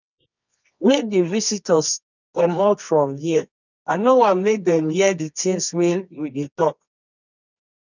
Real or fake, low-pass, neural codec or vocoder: fake; 7.2 kHz; codec, 24 kHz, 0.9 kbps, WavTokenizer, medium music audio release